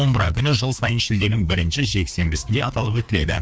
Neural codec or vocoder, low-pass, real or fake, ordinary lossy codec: codec, 16 kHz, 2 kbps, FreqCodec, larger model; none; fake; none